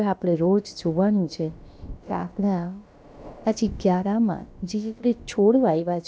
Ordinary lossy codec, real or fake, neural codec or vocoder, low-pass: none; fake; codec, 16 kHz, about 1 kbps, DyCAST, with the encoder's durations; none